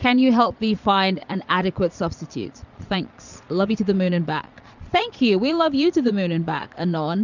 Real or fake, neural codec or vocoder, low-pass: fake; vocoder, 22.05 kHz, 80 mel bands, Vocos; 7.2 kHz